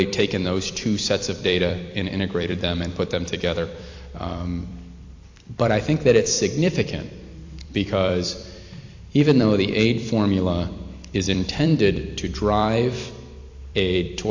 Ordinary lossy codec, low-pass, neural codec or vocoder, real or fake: AAC, 48 kbps; 7.2 kHz; vocoder, 44.1 kHz, 128 mel bands every 256 samples, BigVGAN v2; fake